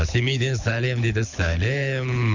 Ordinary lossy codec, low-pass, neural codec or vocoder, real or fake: none; 7.2 kHz; codec, 24 kHz, 6 kbps, HILCodec; fake